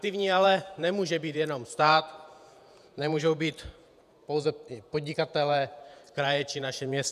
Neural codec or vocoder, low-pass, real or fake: vocoder, 48 kHz, 128 mel bands, Vocos; 14.4 kHz; fake